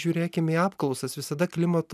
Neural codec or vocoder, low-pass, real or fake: none; 14.4 kHz; real